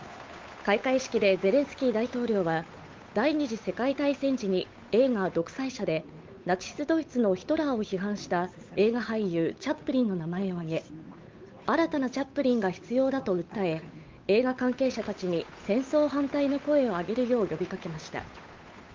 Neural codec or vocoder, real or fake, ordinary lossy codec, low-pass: codec, 16 kHz, 8 kbps, FunCodec, trained on Chinese and English, 25 frames a second; fake; Opus, 24 kbps; 7.2 kHz